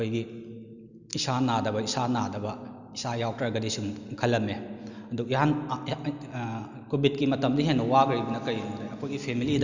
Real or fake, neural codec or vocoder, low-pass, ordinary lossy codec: real; none; 7.2 kHz; Opus, 64 kbps